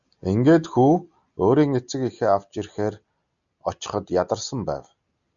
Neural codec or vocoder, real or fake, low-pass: none; real; 7.2 kHz